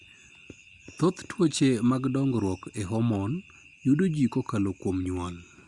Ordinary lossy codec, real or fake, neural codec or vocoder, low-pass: Opus, 64 kbps; real; none; 10.8 kHz